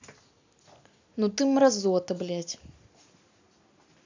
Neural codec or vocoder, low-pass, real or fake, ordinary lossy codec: none; 7.2 kHz; real; AAC, 48 kbps